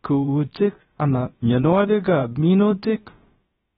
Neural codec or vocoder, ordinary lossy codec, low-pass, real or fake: codec, 16 kHz, about 1 kbps, DyCAST, with the encoder's durations; AAC, 16 kbps; 7.2 kHz; fake